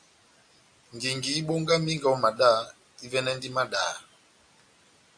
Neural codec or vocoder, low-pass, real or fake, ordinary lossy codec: none; 9.9 kHz; real; AAC, 64 kbps